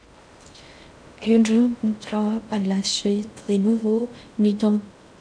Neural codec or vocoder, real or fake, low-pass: codec, 16 kHz in and 24 kHz out, 0.6 kbps, FocalCodec, streaming, 2048 codes; fake; 9.9 kHz